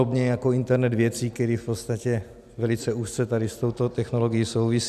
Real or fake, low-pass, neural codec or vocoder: real; 14.4 kHz; none